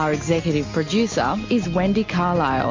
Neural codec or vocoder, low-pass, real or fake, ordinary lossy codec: none; 7.2 kHz; real; MP3, 48 kbps